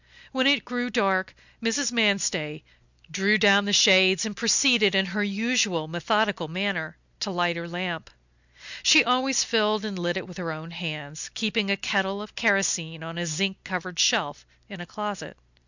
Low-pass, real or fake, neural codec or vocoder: 7.2 kHz; real; none